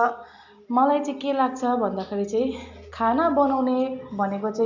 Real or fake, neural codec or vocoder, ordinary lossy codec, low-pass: real; none; MP3, 64 kbps; 7.2 kHz